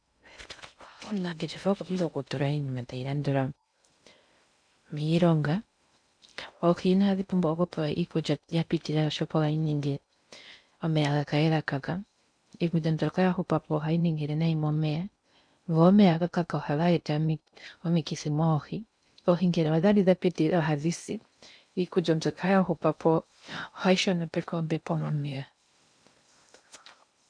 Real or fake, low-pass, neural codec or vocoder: fake; 9.9 kHz; codec, 16 kHz in and 24 kHz out, 0.6 kbps, FocalCodec, streaming, 2048 codes